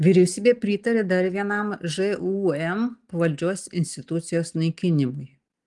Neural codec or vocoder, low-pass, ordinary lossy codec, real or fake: none; 10.8 kHz; Opus, 24 kbps; real